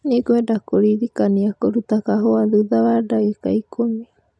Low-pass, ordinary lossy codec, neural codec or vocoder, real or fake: none; none; none; real